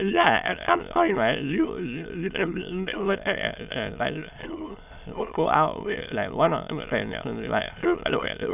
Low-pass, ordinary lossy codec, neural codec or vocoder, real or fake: 3.6 kHz; none; autoencoder, 22.05 kHz, a latent of 192 numbers a frame, VITS, trained on many speakers; fake